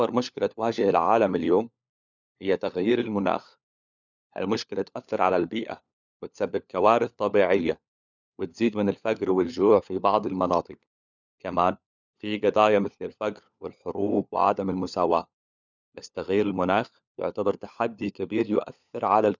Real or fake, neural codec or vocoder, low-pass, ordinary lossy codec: fake; codec, 16 kHz, 4 kbps, FunCodec, trained on LibriTTS, 50 frames a second; 7.2 kHz; none